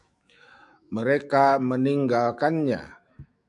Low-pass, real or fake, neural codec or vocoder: 10.8 kHz; fake; autoencoder, 48 kHz, 128 numbers a frame, DAC-VAE, trained on Japanese speech